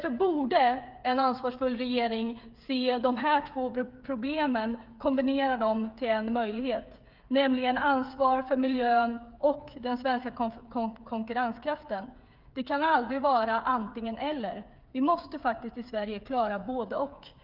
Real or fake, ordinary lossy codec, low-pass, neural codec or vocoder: fake; Opus, 24 kbps; 5.4 kHz; codec, 16 kHz, 8 kbps, FreqCodec, smaller model